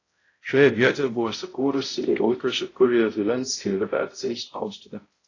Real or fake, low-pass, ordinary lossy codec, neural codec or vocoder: fake; 7.2 kHz; AAC, 32 kbps; codec, 16 kHz, 0.5 kbps, X-Codec, HuBERT features, trained on balanced general audio